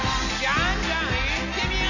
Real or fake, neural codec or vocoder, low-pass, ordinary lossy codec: real; none; 7.2 kHz; none